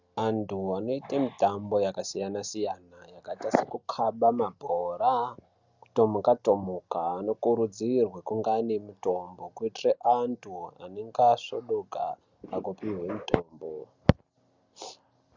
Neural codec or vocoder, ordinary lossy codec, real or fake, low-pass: none; Opus, 64 kbps; real; 7.2 kHz